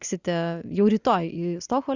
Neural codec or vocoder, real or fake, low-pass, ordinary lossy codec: none; real; 7.2 kHz; Opus, 64 kbps